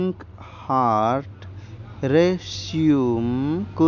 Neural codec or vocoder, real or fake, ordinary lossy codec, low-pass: none; real; none; 7.2 kHz